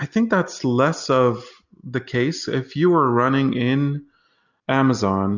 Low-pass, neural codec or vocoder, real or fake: 7.2 kHz; none; real